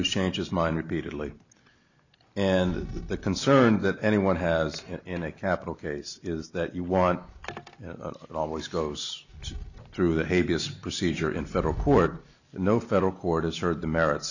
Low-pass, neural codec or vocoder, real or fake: 7.2 kHz; none; real